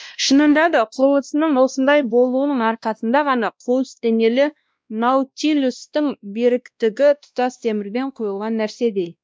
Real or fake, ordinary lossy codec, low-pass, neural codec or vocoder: fake; none; none; codec, 16 kHz, 1 kbps, X-Codec, WavLM features, trained on Multilingual LibriSpeech